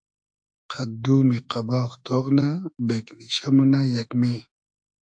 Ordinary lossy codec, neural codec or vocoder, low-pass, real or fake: AAC, 64 kbps; autoencoder, 48 kHz, 32 numbers a frame, DAC-VAE, trained on Japanese speech; 9.9 kHz; fake